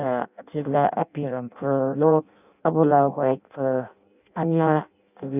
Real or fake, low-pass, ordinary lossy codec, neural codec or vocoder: fake; 3.6 kHz; none; codec, 16 kHz in and 24 kHz out, 0.6 kbps, FireRedTTS-2 codec